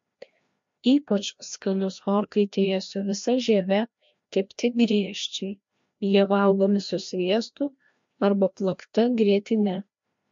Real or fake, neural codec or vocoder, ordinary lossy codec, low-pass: fake; codec, 16 kHz, 1 kbps, FreqCodec, larger model; MP3, 48 kbps; 7.2 kHz